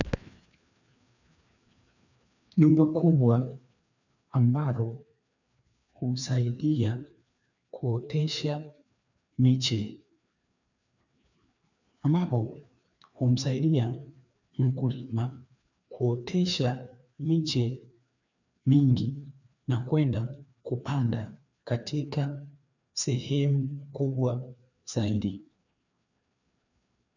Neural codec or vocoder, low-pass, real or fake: codec, 16 kHz, 2 kbps, FreqCodec, larger model; 7.2 kHz; fake